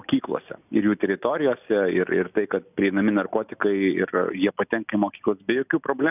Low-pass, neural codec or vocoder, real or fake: 3.6 kHz; none; real